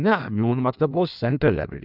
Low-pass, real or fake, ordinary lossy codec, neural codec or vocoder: 5.4 kHz; fake; none; codec, 16 kHz in and 24 kHz out, 1.1 kbps, FireRedTTS-2 codec